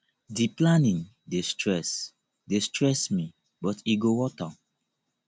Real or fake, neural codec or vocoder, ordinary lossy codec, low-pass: real; none; none; none